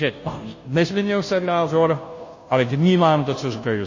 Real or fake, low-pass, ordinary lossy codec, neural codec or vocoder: fake; 7.2 kHz; MP3, 32 kbps; codec, 16 kHz, 0.5 kbps, FunCodec, trained on Chinese and English, 25 frames a second